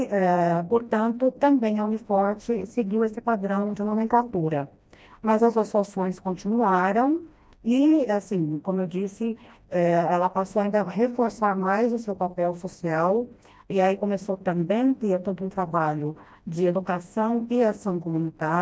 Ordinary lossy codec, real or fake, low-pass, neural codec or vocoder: none; fake; none; codec, 16 kHz, 1 kbps, FreqCodec, smaller model